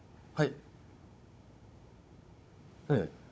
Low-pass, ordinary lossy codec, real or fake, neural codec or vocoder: none; none; fake; codec, 16 kHz, 16 kbps, FunCodec, trained on Chinese and English, 50 frames a second